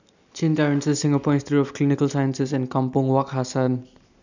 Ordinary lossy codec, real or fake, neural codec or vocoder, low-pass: none; real; none; 7.2 kHz